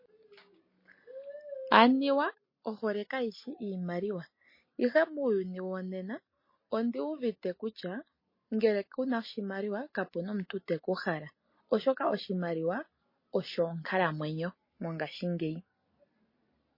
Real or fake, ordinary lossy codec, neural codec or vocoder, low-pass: real; MP3, 24 kbps; none; 5.4 kHz